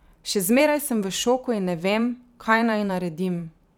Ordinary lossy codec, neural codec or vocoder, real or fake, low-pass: none; vocoder, 44.1 kHz, 128 mel bands every 512 samples, BigVGAN v2; fake; 19.8 kHz